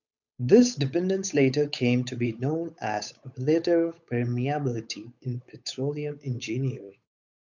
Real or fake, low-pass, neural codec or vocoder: fake; 7.2 kHz; codec, 16 kHz, 8 kbps, FunCodec, trained on Chinese and English, 25 frames a second